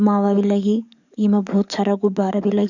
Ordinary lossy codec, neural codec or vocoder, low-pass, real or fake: none; codec, 44.1 kHz, 7.8 kbps, DAC; 7.2 kHz; fake